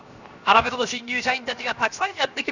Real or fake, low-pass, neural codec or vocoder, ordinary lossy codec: fake; 7.2 kHz; codec, 16 kHz, 0.7 kbps, FocalCodec; none